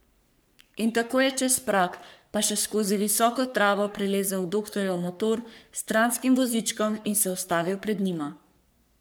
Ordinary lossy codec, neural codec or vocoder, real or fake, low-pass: none; codec, 44.1 kHz, 3.4 kbps, Pupu-Codec; fake; none